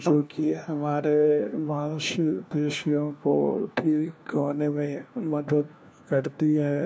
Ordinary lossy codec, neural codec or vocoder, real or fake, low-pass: none; codec, 16 kHz, 1 kbps, FunCodec, trained on LibriTTS, 50 frames a second; fake; none